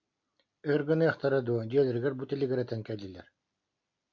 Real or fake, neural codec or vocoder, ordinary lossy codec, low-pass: real; none; AAC, 48 kbps; 7.2 kHz